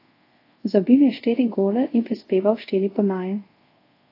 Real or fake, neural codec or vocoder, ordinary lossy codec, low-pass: fake; codec, 24 kHz, 0.5 kbps, DualCodec; AAC, 32 kbps; 5.4 kHz